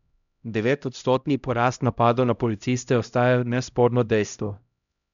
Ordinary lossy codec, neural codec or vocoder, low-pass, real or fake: none; codec, 16 kHz, 0.5 kbps, X-Codec, HuBERT features, trained on LibriSpeech; 7.2 kHz; fake